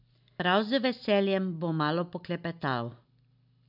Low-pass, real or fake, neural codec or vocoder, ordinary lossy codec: 5.4 kHz; real; none; none